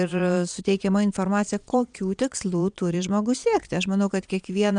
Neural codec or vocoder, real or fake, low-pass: vocoder, 22.05 kHz, 80 mel bands, Vocos; fake; 9.9 kHz